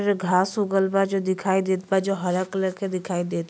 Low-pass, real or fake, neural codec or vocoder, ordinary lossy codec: none; real; none; none